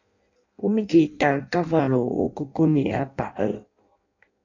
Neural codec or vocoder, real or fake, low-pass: codec, 16 kHz in and 24 kHz out, 0.6 kbps, FireRedTTS-2 codec; fake; 7.2 kHz